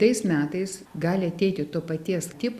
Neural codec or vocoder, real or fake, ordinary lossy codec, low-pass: none; real; Opus, 64 kbps; 14.4 kHz